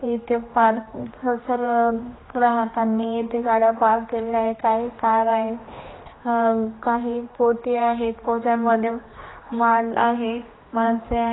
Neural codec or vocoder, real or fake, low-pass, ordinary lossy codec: codec, 16 kHz, 2 kbps, X-Codec, HuBERT features, trained on general audio; fake; 7.2 kHz; AAC, 16 kbps